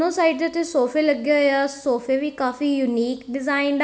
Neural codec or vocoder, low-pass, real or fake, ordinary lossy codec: none; none; real; none